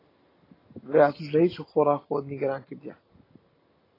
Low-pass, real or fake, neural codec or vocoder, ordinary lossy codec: 5.4 kHz; real; none; AAC, 24 kbps